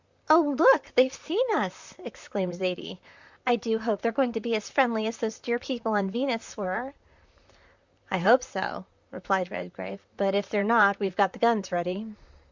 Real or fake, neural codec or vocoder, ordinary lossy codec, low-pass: fake; vocoder, 44.1 kHz, 128 mel bands, Pupu-Vocoder; Opus, 64 kbps; 7.2 kHz